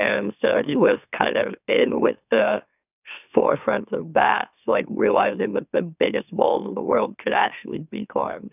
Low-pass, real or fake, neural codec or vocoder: 3.6 kHz; fake; autoencoder, 44.1 kHz, a latent of 192 numbers a frame, MeloTTS